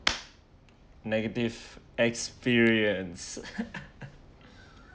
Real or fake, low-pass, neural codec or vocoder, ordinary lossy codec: real; none; none; none